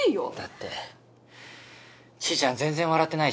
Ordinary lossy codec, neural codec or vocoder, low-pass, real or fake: none; none; none; real